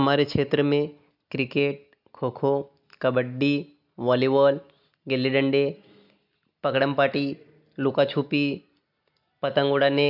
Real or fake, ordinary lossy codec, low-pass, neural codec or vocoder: real; none; 5.4 kHz; none